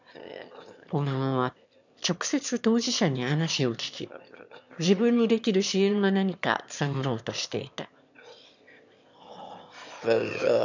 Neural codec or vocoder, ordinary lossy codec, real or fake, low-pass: autoencoder, 22.05 kHz, a latent of 192 numbers a frame, VITS, trained on one speaker; none; fake; 7.2 kHz